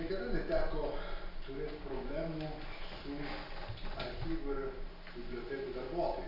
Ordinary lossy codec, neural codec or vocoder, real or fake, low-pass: Opus, 64 kbps; none; real; 5.4 kHz